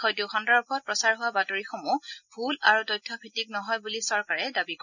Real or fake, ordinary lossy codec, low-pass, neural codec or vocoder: real; none; 7.2 kHz; none